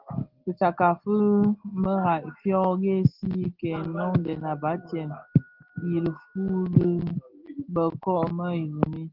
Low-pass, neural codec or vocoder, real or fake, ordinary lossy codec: 5.4 kHz; none; real; Opus, 16 kbps